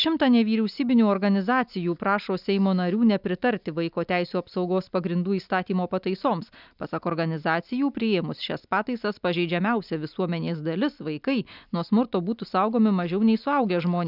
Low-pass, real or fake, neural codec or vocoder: 5.4 kHz; real; none